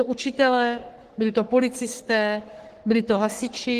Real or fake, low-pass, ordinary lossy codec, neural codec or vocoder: fake; 14.4 kHz; Opus, 16 kbps; codec, 44.1 kHz, 3.4 kbps, Pupu-Codec